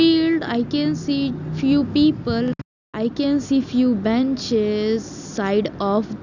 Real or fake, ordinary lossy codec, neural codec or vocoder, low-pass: real; none; none; 7.2 kHz